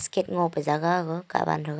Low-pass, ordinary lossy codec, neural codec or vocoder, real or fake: none; none; none; real